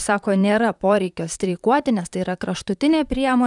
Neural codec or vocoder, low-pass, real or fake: vocoder, 44.1 kHz, 128 mel bands, Pupu-Vocoder; 10.8 kHz; fake